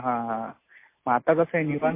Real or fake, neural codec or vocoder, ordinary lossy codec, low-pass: real; none; MP3, 24 kbps; 3.6 kHz